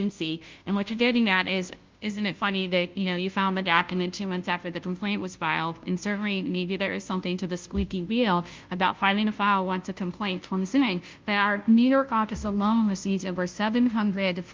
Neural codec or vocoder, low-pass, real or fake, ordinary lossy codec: codec, 16 kHz, 0.5 kbps, FunCodec, trained on Chinese and English, 25 frames a second; 7.2 kHz; fake; Opus, 32 kbps